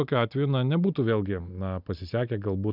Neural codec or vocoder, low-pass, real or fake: none; 5.4 kHz; real